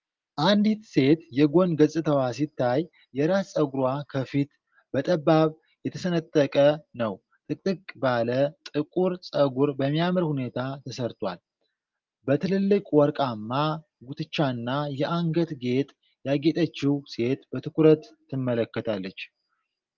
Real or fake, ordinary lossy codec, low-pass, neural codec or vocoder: real; Opus, 32 kbps; 7.2 kHz; none